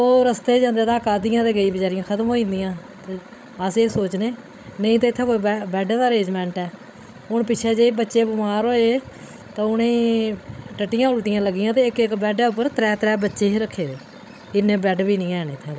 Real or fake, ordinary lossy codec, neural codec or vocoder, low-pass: fake; none; codec, 16 kHz, 16 kbps, FreqCodec, larger model; none